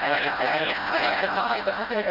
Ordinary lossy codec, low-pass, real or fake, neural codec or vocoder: none; 5.4 kHz; fake; codec, 16 kHz, 0.5 kbps, FreqCodec, smaller model